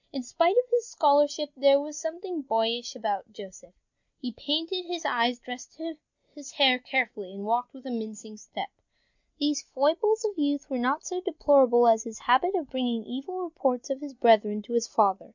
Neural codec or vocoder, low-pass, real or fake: none; 7.2 kHz; real